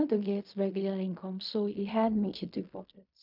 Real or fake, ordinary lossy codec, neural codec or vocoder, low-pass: fake; none; codec, 16 kHz in and 24 kHz out, 0.4 kbps, LongCat-Audio-Codec, fine tuned four codebook decoder; 5.4 kHz